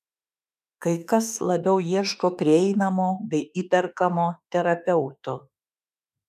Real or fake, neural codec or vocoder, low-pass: fake; autoencoder, 48 kHz, 32 numbers a frame, DAC-VAE, trained on Japanese speech; 14.4 kHz